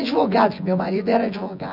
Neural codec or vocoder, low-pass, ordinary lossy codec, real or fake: vocoder, 24 kHz, 100 mel bands, Vocos; 5.4 kHz; none; fake